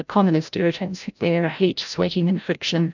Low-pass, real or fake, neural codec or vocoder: 7.2 kHz; fake; codec, 16 kHz, 0.5 kbps, FreqCodec, larger model